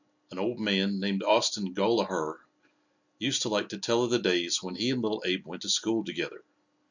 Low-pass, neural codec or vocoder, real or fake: 7.2 kHz; none; real